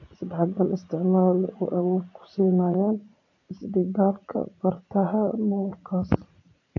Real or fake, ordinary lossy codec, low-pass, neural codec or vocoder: fake; AAC, 48 kbps; 7.2 kHz; vocoder, 44.1 kHz, 80 mel bands, Vocos